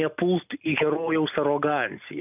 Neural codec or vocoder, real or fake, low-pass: none; real; 3.6 kHz